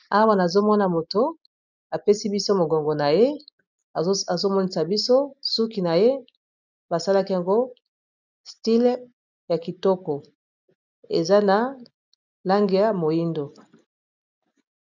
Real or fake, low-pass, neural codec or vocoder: real; 7.2 kHz; none